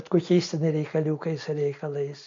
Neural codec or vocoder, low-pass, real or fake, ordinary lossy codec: none; 7.2 kHz; real; AAC, 48 kbps